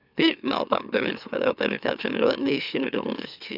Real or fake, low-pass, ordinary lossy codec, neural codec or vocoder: fake; 5.4 kHz; none; autoencoder, 44.1 kHz, a latent of 192 numbers a frame, MeloTTS